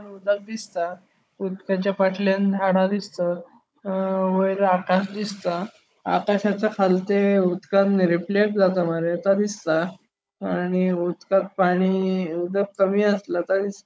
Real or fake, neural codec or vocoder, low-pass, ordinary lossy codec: fake; codec, 16 kHz, 16 kbps, FunCodec, trained on Chinese and English, 50 frames a second; none; none